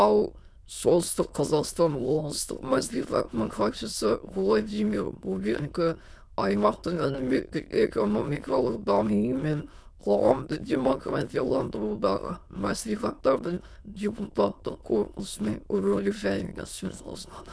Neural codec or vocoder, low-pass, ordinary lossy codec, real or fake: autoencoder, 22.05 kHz, a latent of 192 numbers a frame, VITS, trained on many speakers; none; none; fake